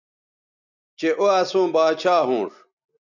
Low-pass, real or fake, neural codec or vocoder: 7.2 kHz; real; none